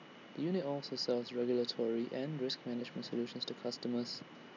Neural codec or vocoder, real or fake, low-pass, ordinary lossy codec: none; real; 7.2 kHz; none